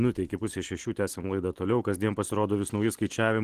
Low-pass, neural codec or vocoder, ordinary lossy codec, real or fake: 14.4 kHz; none; Opus, 16 kbps; real